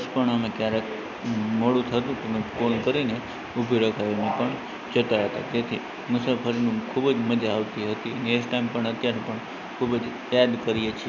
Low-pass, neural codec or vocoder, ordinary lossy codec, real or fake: 7.2 kHz; none; none; real